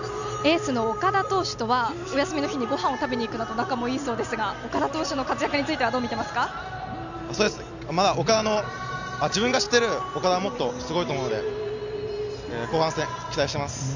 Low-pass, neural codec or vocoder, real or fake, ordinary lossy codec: 7.2 kHz; none; real; none